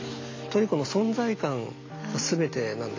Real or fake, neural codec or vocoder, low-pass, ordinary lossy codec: real; none; 7.2 kHz; AAC, 32 kbps